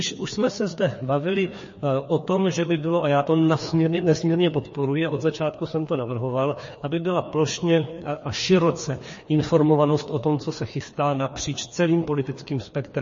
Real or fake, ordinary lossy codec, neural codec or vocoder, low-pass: fake; MP3, 32 kbps; codec, 16 kHz, 2 kbps, FreqCodec, larger model; 7.2 kHz